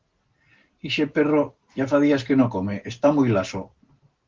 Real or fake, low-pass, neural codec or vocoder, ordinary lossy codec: real; 7.2 kHz; none; Opus, 16 kbps